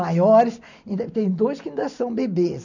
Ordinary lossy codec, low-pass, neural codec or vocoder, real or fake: none; 7.2 kHz; none; real